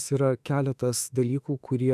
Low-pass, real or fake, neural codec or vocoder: 14.4 kHz; fake; autoencoder, 48 kHz, 32 numbers a frame, DAC-VAE, trained on Japanese speech